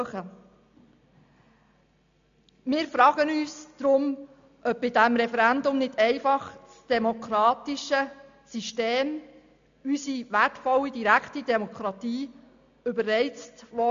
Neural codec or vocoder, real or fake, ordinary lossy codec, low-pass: none; real; AAC, 64 kbps; 7.2 kHz